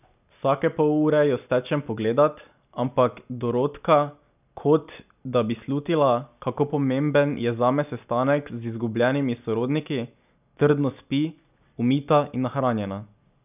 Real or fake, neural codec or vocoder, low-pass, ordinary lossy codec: real; none; 3.6 kHz; none